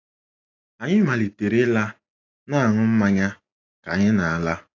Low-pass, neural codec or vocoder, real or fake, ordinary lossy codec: 7.2 kHz; none; real; AAC, 32 kbps